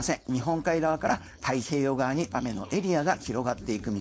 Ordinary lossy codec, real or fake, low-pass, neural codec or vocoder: none; fake; none; codec, 16 kHz, 4.8 kbps, FACodec